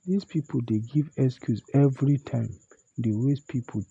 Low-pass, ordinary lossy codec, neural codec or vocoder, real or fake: 9.9 kHz; none; none; real